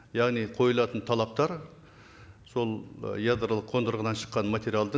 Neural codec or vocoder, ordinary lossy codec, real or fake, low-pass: none; none; real; none